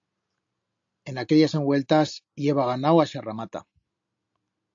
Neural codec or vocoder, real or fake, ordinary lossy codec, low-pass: none; real; AAC, 64 kbps; 7.2 kHz